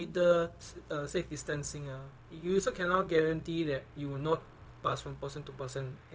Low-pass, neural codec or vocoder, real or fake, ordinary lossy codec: none; codec, 16 kHz, 0.4 kbps, LongCat-Audio-Codec; fake; none